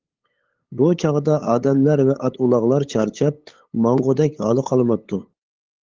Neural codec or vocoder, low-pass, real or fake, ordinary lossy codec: codec, 16 kHz, 8 kbps, FunCodec, trained on LibriTTS, 25 frames a second; 7.2 kHz; fake; Opus, 16 kbps